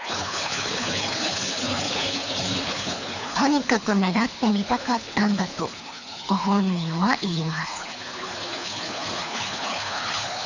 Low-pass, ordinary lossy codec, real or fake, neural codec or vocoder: 7.2 kHz; AAC, 48 kbps; fake; codec, 24 kHz, 3 kbps, HILCodec